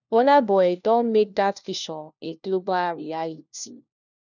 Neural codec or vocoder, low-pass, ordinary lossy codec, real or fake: codec, 16 kHz, 1 kbps, FunCodec, trained on LibriTTS, 50 frames a second; 7.2 kHz; none; fake